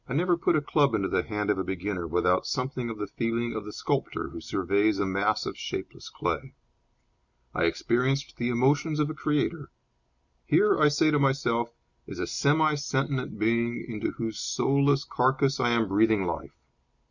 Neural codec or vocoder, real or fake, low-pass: none; real; 7.2 kHz